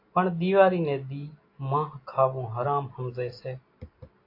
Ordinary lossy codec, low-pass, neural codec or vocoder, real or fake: AAC, 32 kbps; 5.4 kHz; none; real